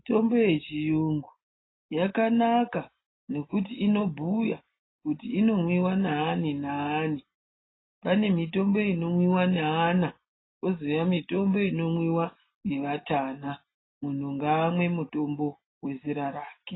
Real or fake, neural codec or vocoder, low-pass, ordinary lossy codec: real; none; 7.2 kHz; AAC, 16 kbps